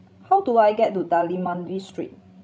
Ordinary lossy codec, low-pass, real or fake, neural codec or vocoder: none; none; fake; codec, 16 kHz, 16 kbps, FreqCodec, larger model